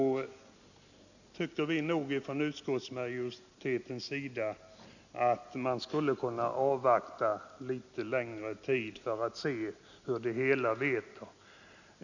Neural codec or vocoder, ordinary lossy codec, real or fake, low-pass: none; none; real; 7.2 kHz